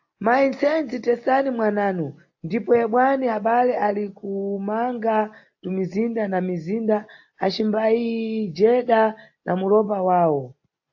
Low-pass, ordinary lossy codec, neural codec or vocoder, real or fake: 7.2 kHz; AAC, 48 kbps; none; real